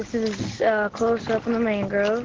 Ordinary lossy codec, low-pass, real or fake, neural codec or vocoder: Opus, 16 kbps; 7.2 kHz; real; none